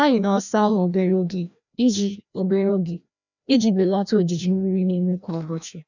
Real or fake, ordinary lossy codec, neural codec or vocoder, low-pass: fake; none; codec, 16 kHz, 1 kbps, FreqCodec, larger model; 7.2 kHz